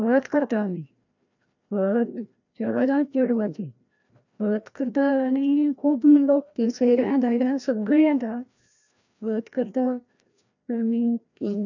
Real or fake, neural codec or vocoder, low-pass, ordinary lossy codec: fake; codec, 16 kHz, 1 kbps, FreqCodec, larger model; 7.2 kHz; none